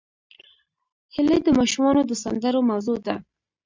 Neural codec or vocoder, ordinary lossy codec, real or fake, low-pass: none; MP3, 64 kbps; real; 7.2 kHz